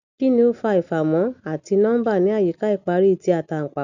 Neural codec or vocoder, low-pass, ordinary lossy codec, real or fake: none; 7.2 kHz; none; real